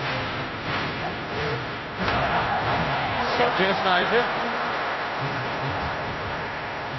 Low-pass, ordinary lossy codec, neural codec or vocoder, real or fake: 7.2 kHz; MP3, 24 kbps; codec, 16 kHz, 0.5 kbps, FunCodec, trained on Chinese and English, 25 frames a second; fake